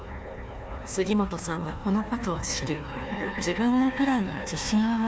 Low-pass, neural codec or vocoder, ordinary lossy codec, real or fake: none; codec, 16 kHz, 1 kbps, FunCodec, trained on Chinese and English, 50 frames a second; none; fake